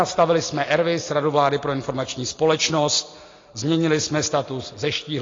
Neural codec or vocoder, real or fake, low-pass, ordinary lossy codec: none; real; 7.2 kHz; AAC, 32 kbps